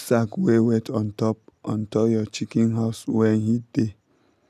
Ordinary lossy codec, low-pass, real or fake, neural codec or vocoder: none; 14.4 kHz; real; none